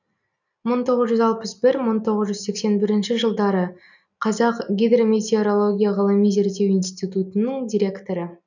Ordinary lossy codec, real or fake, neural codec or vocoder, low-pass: none; real; none; 7.2 kHz